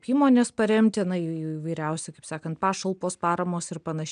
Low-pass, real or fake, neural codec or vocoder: 9.9 kHz; real; none